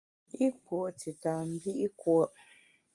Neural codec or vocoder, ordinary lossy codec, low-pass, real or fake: none; Opus, 32 kbps; 10.8 kHz; real